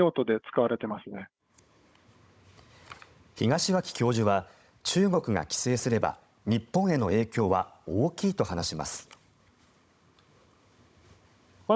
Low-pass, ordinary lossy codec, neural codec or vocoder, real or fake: none; none; codec, 16 kHz, 16 kbps, FunCodec, trained on Chinese and English, 50 frames a second; fake